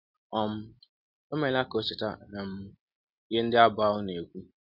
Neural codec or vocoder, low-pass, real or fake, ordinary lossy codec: none; 5.4 kHz; real; none